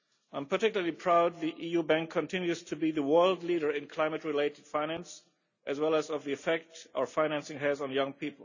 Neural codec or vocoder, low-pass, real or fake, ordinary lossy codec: none; 7.2 kHz; real; none